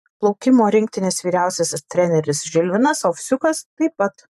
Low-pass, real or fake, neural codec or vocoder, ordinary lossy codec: 14.4 kHz; fake; vocoder, 44.1 kHz, 128 mel bands every 512 samples, BigVGAN v2; AAC, 96 kbps